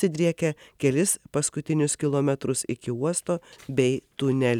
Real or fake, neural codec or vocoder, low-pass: real; none; 19.8 kHz